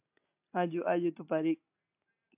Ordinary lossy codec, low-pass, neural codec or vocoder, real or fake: AAC, 32 kbps; 3.6 kHz; none; real